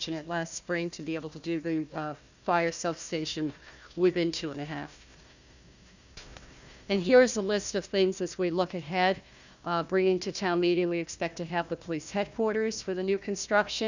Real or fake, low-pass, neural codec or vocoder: fake; 7.2 kHz; codec, 16 kHz, 1 kbps, FunCodec, trained on Chinese and English, 50 frames a second